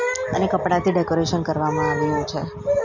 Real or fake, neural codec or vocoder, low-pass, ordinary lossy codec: real; none; 7.2 kHz; none